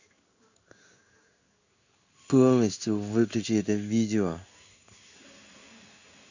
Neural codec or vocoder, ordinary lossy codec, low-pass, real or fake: codec, 16 kHz in and 24 kHz out, 1 kbps, XY-Tokenizer; none; 7.2 kHz; fake